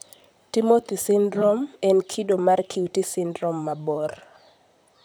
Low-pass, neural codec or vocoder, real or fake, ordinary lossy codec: none; vocoder, 44.1 kHz, 128 mel bands, Pupu-Vocoder; fake; none